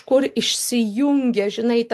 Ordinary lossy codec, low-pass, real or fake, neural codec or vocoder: AAC, 64 kbps; 14.4 kHz; real; none